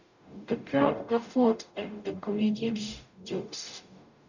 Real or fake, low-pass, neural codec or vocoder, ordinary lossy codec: fake; 7.2 kHz; codec, 44.1 kHz, 0.9 kbps, DAC; none